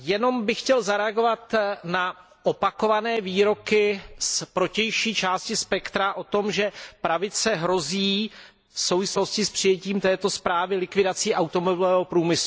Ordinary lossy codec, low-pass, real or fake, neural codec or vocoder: none; none; real; none